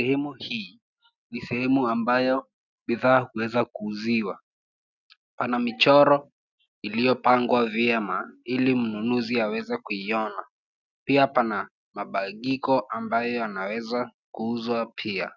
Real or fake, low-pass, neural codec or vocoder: real; 7.2 kHz; none